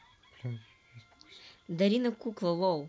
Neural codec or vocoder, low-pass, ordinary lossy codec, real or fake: none; none; none; real